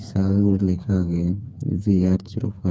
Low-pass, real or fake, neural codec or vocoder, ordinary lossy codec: none; fake; codec, 16 kHz, 4 kbps, FreqCodec, smaller model; none